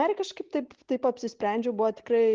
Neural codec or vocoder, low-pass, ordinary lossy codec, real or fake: none; 7.2 kHz; Opus, 16 kbps; real